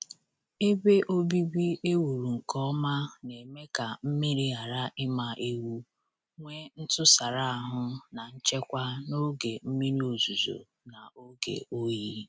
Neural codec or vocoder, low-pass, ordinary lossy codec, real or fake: none; none; none; real